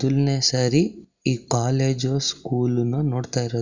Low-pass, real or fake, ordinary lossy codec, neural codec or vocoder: 7.2 kHz; real; Opus, 64 kbps; none